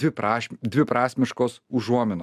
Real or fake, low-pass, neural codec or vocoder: real; 14.4 kHz; none